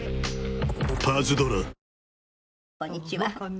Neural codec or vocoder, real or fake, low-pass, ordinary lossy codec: none; real; none; none